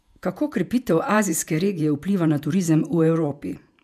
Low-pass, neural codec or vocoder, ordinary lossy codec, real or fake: 14.4 kHz; vocoder, 48 kHz, 128 mel bands, Vocos; none; fake